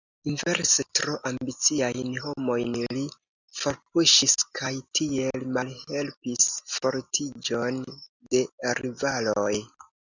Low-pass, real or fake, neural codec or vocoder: 7.2 kHz; real; none